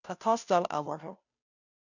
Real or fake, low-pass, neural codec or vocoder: fake; 7.2 kHz; codec, 16 kHz, 0.5 kbps, FunCodec, trained on Chinese and English, 25 frames a second